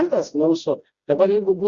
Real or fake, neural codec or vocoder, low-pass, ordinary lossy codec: fake; codec, 16 kHz, 1 kbps, FreqCodec, smaller model; 7.2 kHz; Opus, 64 kbps